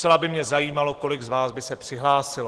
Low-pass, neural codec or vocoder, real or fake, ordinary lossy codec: 10.8 kHz; none; real; Opus, 16 kbps